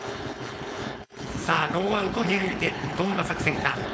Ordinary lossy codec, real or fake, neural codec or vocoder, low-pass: none; fake; codec, 16 kHz, 4.8 kbps, FACodec; none